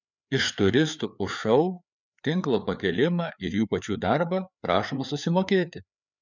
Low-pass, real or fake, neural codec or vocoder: 7.2 kHz; fake; codec, 16 kHz, 4 kbps, FreqCodec, larger model